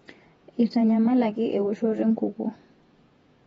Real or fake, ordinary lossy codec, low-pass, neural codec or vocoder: fake; AAC, 24 kbps; 19.8 kHz; vocoder, 48 kHz, 128 mel bands, Vocos